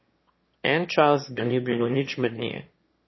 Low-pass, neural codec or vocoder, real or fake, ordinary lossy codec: 7.2 kHz; autoencoder, 22.05 kHz, a latent of 192 numbers a frame, VITS, trained on one speaker; fake; MP3, 24 kbps